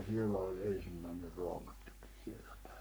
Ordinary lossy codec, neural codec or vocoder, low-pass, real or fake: none; codec, 44.1 kHz, 3.4 kbps, Pupu-Codec; none; fake